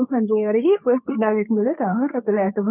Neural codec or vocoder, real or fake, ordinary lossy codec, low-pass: codec, 24 kHz, 0.9 kbps, WavTokenizer, medium speech release version 2; fake; none; 3.6 kHz